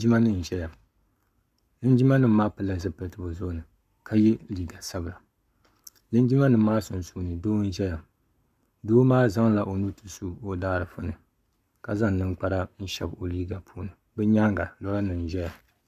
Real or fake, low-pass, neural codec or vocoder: fake; 14.4 kHz; codec, 44.1 kHz, 7.8 kbps, Pupu-Codec